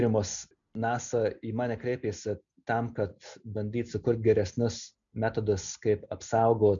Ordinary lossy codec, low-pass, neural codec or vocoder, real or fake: MP3, 64 kbps; 7.2 kHz; none; real